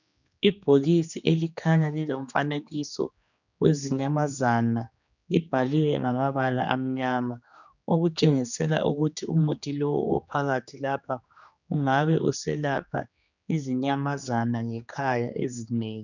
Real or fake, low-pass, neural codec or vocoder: fake; 7.2 kHz; codec, 16 kHz, 2 kbps, X-Codec, HuBERT features, trained on general audio